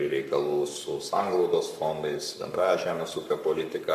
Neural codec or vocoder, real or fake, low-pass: codec, 44.1 kHz, 7.8 kbps, Pupu-Codec; fake; 14.4 kHz